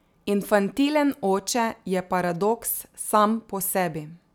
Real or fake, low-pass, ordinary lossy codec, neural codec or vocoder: real; none; none; none